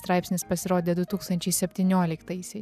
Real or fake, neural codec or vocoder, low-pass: real; none; 14.4 kHz